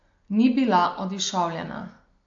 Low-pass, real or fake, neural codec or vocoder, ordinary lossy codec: 7.2 kHz; real; none; AAC, 48 kbps